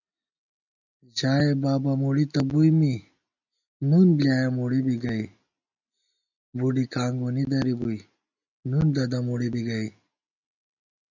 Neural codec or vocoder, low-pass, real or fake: none; 7.2 kHz; real